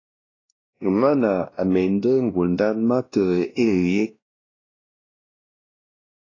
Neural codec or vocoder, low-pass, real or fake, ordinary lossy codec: codec, 16 kHz, 1 kbps, X-Codec, WavLM features, trained on Multilingual LibriSpeech; 7.2 kHz; fake; AAC, 32 kbps